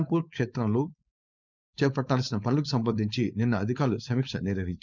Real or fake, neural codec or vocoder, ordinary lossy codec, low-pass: fake; codec, 16 kHz, 4.8 kbps, FACodec; none; 7.2 kHz